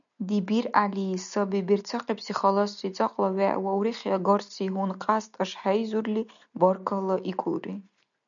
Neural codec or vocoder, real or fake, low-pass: none; real; 7.2 kHz